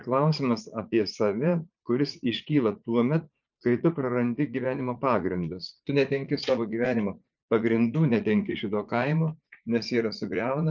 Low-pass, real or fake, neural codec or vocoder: 7.2 kHz; fake; vocoder, 44.1 kHz, 80 mel bands, Vocos